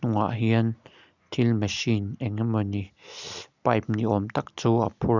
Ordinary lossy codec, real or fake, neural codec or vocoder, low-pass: none; real; none; 7.2 kHz